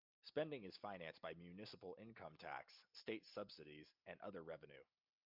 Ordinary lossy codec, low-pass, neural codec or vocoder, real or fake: MP3, 48 kbps; 5.4 kHz; none; real